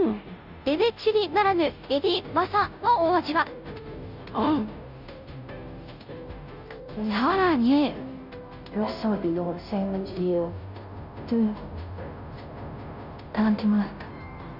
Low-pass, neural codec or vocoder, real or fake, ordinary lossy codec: 5.4 kHz; codec, 16 kHz, 0.5 kbps, FunCodec, trained on Chinese and English, 25 frames a second; fake; none